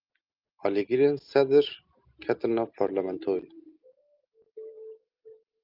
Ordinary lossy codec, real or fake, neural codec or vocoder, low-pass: Opus, 24 kbps; real; none; 5.4 kHz